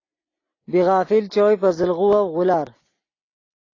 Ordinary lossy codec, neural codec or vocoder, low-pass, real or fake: AAC, 32 kbps; none; 7.2 kHz; real